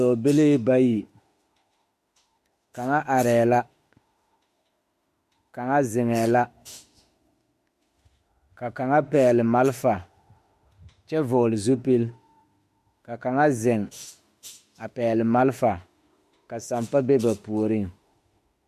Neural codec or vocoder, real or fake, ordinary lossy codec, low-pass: autoencoder, 48 kHz, 32 numbers a frame, DAC-VAE, trained on Japanese speech; fake; MP3, 64 kbps; 14.4 kHz